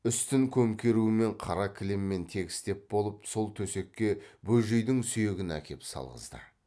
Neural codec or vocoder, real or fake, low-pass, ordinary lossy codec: none; real; none; none